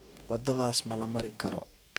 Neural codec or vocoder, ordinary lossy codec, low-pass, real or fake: codec, 44.1 kHz, 2.6 kbps, DAC; none; none; fake